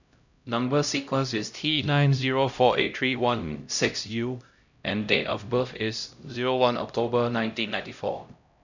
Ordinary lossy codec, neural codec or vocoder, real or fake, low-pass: none; codec, 16 kHz, 0.5 kbps, X-Codec, HuBERT features, trained on LibriSpeech; fake; 7.2 kHz